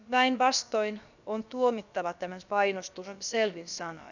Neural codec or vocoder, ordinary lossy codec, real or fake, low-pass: codec, 16 kHz, about 1 kbps, DyCAST, with the encoder's durations; none; fake; 7.2 kHz